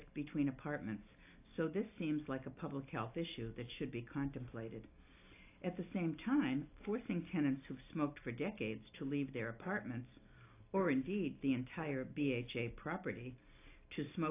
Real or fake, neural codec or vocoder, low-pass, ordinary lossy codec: real; none; 3.6 kHz; AAC, 24 kbps